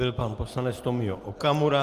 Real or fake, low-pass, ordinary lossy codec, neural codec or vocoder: real; 14.4 kHz; Opus, 24 kbps; none